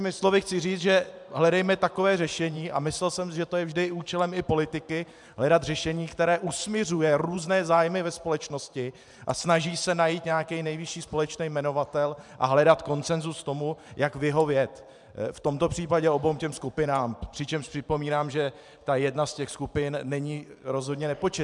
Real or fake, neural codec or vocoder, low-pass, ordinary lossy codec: fake; vocoder, 44.1 kHz, 128 mel bands every 512 samples, BigVGAN v2; 10.8 kHz; MP3, 96 kbps